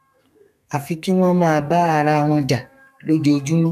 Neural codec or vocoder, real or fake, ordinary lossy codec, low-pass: codec, 32 kHz, 1.9 kbps, SNAC; fake; none; 14.4 kHz